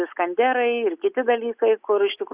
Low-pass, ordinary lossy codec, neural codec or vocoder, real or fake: 3.6 kHz; Opus, 64 kbps; none; real